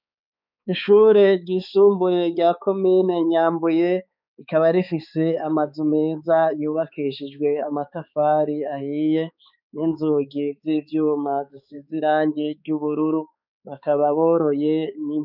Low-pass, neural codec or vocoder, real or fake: 5.4 kHz; codec, 16 kHz, 4 kbps, X-Codec, HuBERT features, trained on balanced general audio; fake